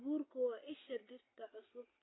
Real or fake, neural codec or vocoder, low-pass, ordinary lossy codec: real; none; 7.2 kHz; AAC, 16 kbps